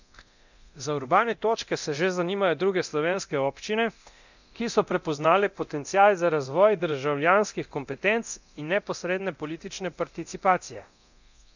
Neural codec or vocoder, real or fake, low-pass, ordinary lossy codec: codec, 24 kHz, 0.9 kbps, DualCodec; fake; 7.2 kHz; none